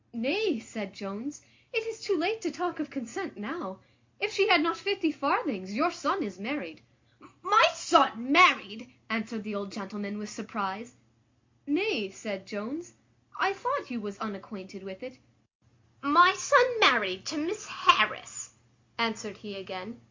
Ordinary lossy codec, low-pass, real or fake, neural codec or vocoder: MP3, 48 kbps; 7.2 kHz; real; none